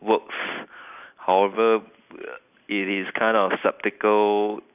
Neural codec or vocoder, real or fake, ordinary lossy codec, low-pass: none; real; none; 3.6 kHz